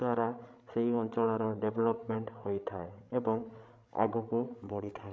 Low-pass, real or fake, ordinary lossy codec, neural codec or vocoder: none; fake; none; codec, 16 kHz, 16 kbps, FreqCodec, larger model